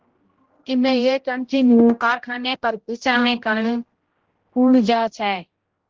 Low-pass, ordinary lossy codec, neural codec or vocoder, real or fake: 7.2 kHz; Opus, 16 kbps; codec, 16 kHz, 0.5 kbps, X-Codec, HuBERT features, trained on general audio; fake